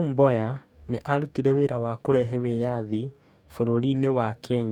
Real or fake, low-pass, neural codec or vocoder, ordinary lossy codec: fake; 19.8 kHz; codec, 44.1 kHz, 2.6 kbps, DAC; none